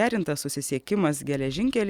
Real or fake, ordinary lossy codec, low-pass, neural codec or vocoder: real; Opus, 64 kbps; 19.8 kHz; none